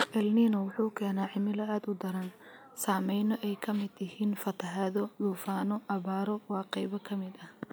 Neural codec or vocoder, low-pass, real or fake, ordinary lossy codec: none; none; real; none